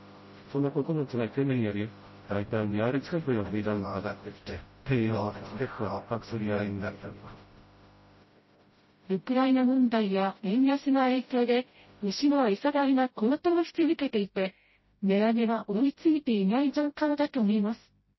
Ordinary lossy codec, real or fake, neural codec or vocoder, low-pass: MP3, 24 kbps; fake; codec, 16 kHz, 0.5 kbps, FreqCodec, smaller model; 7.2 kHz